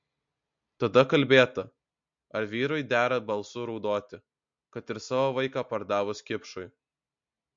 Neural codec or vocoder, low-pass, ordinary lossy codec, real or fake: none; 7.2 kHz; MP3, 48 kbps; real